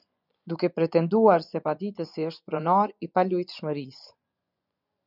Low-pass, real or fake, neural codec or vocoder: 5.4 kHz; fake; vocoder, 24 kHz, 100 mel bands, Vocos